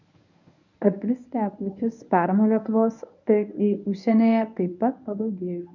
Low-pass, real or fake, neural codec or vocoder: 7.2 kHz; fake; codec, 24 kHz, 0.9 kbps, WavTokenizer, medium speech release version 1